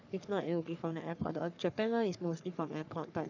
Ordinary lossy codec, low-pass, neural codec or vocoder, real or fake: none; 7.2 kHz; codec, 44.1 kHz, 3.4 kbps, Pupu-Codec; fake